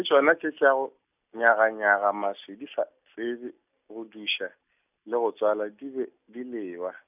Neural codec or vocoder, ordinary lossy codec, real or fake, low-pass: none; none; real; 3.6 kHz